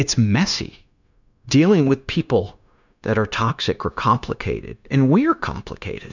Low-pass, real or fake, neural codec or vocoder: 7.2 kHz; fake; codec, 16 kHz, 0.9 kbps, LongCat-Audio-Codec